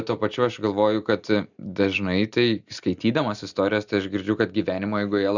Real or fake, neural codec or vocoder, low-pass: real; none; 7.2 kHz